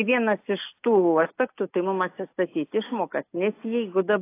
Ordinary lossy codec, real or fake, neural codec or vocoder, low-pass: AAC, 24 kbps; real; none; 3.6 kHz